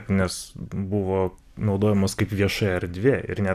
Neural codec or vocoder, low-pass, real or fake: vocoder, 44.1 kHz, 128 mel bands every 256 samples, BigVGAN v2; 14.4 kHz; fake